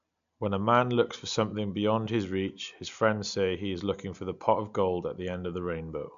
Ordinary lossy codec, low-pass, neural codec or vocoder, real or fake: AAC, 96 kbps; 7.2 kHz; none; real